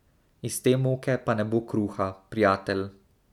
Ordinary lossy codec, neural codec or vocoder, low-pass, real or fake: none; none; 19.8 kHz; real